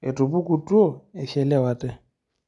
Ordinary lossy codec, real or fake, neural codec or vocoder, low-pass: none; real; none; 10.8 kHz